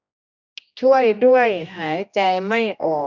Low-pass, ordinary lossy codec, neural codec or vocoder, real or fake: 7.2 kHz; none; codec, 16 kHz, 1 kbps, X-Codec, HuBERT features, trained on general audio; fake